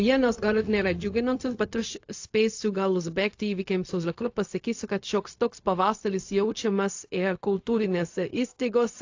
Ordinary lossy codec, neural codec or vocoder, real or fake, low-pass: AAC, 48 kbps; codec, 16 kHz, 0.4 kbps, LongCat-Audio-Codec; fake; 7.2 kHz